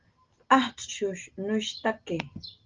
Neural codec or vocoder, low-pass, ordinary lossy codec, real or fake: none; 7.2 kHz; Opus, 24 kbps; real